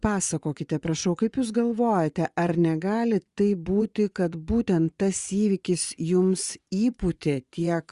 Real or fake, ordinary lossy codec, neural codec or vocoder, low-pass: fake; Opus, 64 kbps; vocoder, 24 kHz, 100 mel bands, Vocos; 10.8 kHz